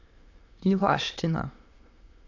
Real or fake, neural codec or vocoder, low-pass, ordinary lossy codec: fake; autoencoder, 22.05 kHz, a latent of 192 numbers a frame, VITS, trained on many speakers; 7.2 kHz; AAC, 48 kbps